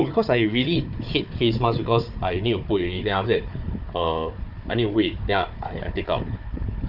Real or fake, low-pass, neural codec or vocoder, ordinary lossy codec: fake; 5.4 kHz; codec, 16 kHz, 4 kbps, FunCodec, trained on Chinese and English, 50 frames a second; none